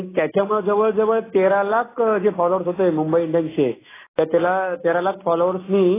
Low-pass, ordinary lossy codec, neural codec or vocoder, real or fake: 3.6 kHz; AAC, 16 kbps; none; real